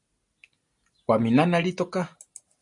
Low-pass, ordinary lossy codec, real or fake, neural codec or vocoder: 10.8 kHz; MP3, 48 kbps; real; none